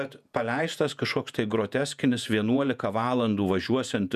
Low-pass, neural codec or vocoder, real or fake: 14.4 kHz; vocoder, 48 kHz, 128 mel bands, Vocos; fake